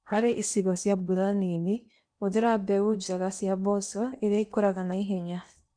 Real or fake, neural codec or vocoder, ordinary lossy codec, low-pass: fake; codec, 16 kHz in and 24 kHz out, 0.8 kbps, FocalCodec, streaming, 65536 codes; none; 9.9 kHz